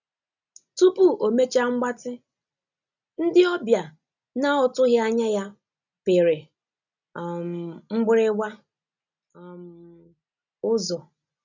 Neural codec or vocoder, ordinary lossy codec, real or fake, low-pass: none; none; real; 7.2 kHz